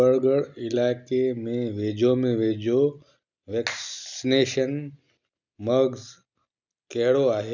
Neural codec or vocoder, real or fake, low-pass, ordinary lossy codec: none; real; 7.2 kHz; none